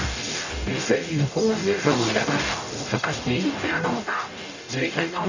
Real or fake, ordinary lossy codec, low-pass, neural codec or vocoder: fake; none; 7.2 kHz; codec, 44.1 kHz, 0.9 kbps, DAC